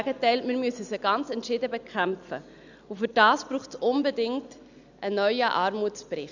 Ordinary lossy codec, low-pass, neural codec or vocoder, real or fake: none; 7.2 kHz; none; real